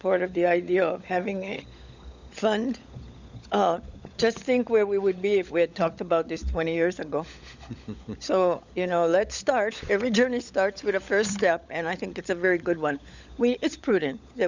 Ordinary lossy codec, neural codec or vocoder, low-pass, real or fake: Opus, 64 kbps; codec, 16 kHz, 16 kbps, FunCodec, trained on LibriTTS, 50 frames a second; 7.2 kHz; fake